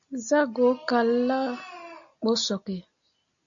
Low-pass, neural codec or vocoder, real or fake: 7.2 kHz; none; real